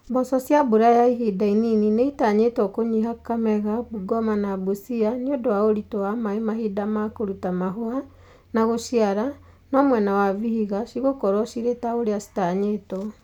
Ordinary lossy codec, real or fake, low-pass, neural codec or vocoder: none; real; 19.8 kHz; none